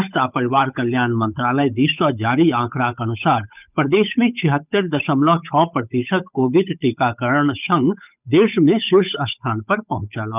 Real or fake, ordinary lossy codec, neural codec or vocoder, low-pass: fake; none; codec, 16 kHz, 8 kbps, FunCodec, trained on Chinese and English, 25 frames a second; 3.6 kHz